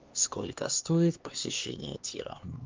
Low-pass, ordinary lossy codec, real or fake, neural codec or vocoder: 7.2 kHz; Opus, 24 kbps; fake; codec, 16 kHz, 2 kbps, X-Codec, HuBERT features, trained on LibriSpeech